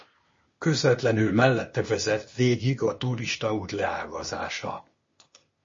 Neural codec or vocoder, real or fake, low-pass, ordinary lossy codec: codec, 16 kHz, 0.8 kbps, ZipCodec; fake; 7.2 kHz; MP3, 32 kbps